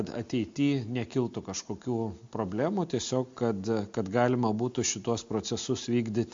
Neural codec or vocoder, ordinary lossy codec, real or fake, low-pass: none; MP3, 48 kbps; real; 7.2 kHz